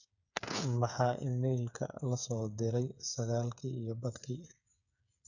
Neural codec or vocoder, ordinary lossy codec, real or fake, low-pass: codec, 16 kHz, 8 kbps, FreqCodec, smaller model; none; fake; 7.2 kHz